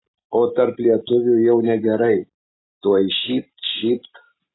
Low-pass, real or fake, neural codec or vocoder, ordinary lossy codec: 7.2 kHz; real; none; AAC, 16 kbps